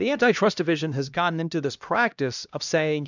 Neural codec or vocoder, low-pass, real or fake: codec, 16 kHz, 1 kbps, X-Codec, HuBERT features, trained on LibriSpeech; 7.2 kHz; fake